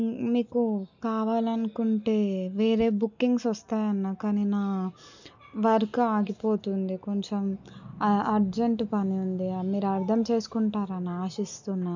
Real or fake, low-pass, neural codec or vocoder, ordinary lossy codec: real; 7.2 kHz; none; none